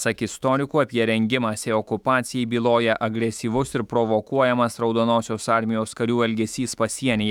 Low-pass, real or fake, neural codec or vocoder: 19.8 kHz; fake; autoencoder, 48 kHz, 128 numbers a frame, DAC-VAE, trained on Japanese speech